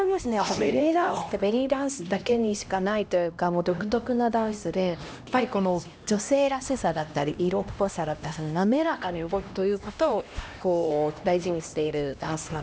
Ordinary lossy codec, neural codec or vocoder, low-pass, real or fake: none; codec, 16 kHz, 1 kbps, X-Codec, HuBERT features, trained on LibriSpeech; none; fake